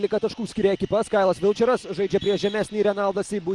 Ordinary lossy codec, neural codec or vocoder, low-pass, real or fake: Opus, 24 kbps; none; 10.8 kHz; real